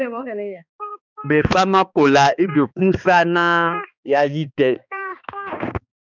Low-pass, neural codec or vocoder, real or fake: 7.2 kHz; codec, 16 kHz, 2 kbps, X-Codec, HuBERT features, trained on balanced general audio; fake